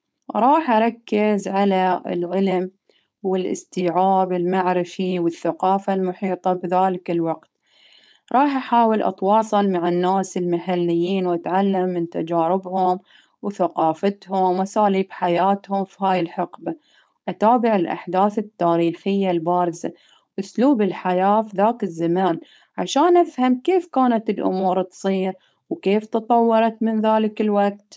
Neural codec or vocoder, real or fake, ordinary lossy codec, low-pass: codec, 16 kHz, 4.8 kbps, FACodec; fake; none; none